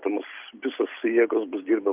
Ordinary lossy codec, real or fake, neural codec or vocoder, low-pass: Opus, 24 kbps; real; none; 3.6 kHz